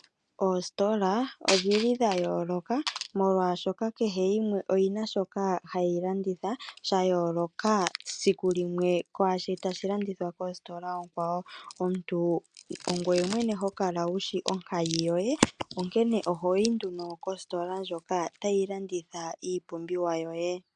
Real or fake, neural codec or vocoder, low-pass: real; none; 9.9 kHz